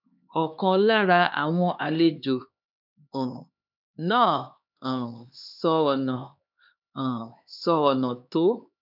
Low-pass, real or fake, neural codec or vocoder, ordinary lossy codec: 5.4 kHz; fake; codec, 16 kHz, 2 kbps, X-Codec, HuBERT features, trained on LibriSpeech; none